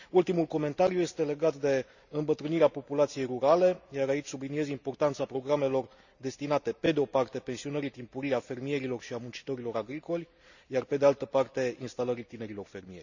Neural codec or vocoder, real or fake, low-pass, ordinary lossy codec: none; real; 7.2 kHz; none